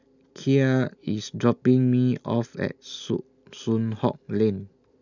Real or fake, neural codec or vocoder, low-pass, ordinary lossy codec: real; none; 7.2 kHz; none